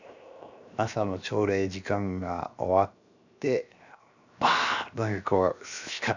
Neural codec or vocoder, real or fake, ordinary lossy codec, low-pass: codec, 16 kHz, 0.7 kbps, FocalCodec; fake; none; 7.2 kHz